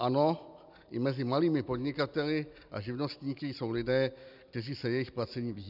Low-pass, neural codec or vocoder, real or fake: 5.4 kHz; none; real